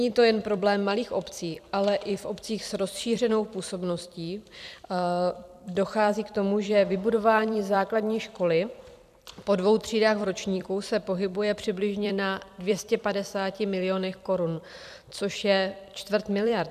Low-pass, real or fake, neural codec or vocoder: 14.4 kHz; fake; vocoder, 44.1 kHz, 128 mel bands every 256 samples, BigVGAN v2